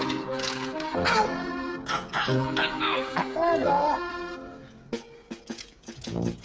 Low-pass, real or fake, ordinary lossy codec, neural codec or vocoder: none; fake; none; codec, 16 kHz, 8 kbps, FreqCodec, smaller model